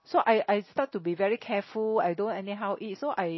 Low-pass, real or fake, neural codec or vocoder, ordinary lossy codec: 7.2 kHz; real; none; MP3, 24 kbps